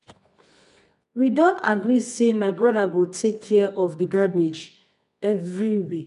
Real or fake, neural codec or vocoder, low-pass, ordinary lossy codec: fake; codec, 24 kHz, 0.9 kbps, WavTokenizer, medium music audio release; 10.8 kHz; none